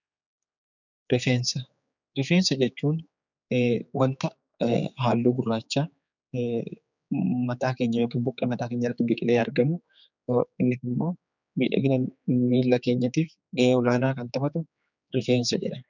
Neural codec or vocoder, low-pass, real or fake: codec, 16 kHz, 4 kbps, X-Codec, HuBERT features, trained on general audio; 7.2 kHz; fake